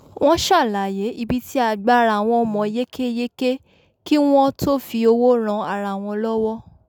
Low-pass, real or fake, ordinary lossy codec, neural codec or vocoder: none; real; none; none